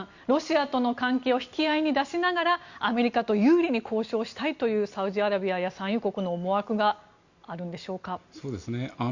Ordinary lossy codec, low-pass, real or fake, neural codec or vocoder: Opus, 64 kbps; 7.2 kHz; real; none